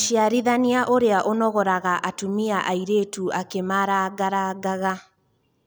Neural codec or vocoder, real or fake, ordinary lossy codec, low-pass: none; real; none; none